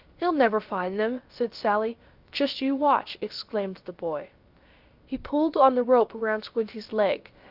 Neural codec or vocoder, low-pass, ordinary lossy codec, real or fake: codec, 16 kHz, about 1 kbps, DyCAST, with the encoder's durations; 5.4 kHz; Opus, 24 kbps; fake